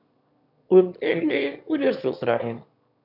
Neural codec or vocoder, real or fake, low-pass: autoencoder, 22.05 kHz, a latent of 192 numbers a frame, VITS, trained on one speaker; fake; 5.4 kHz